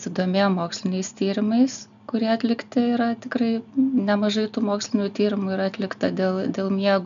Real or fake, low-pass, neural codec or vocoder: real; 7.2 kHz; none